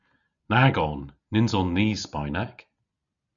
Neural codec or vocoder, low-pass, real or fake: none; 7.2 kHz; real